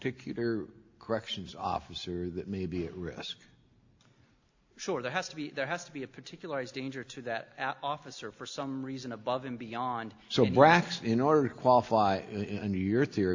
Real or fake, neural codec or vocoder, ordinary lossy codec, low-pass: real; none; AAC, 48 kbps; 7.2 kHz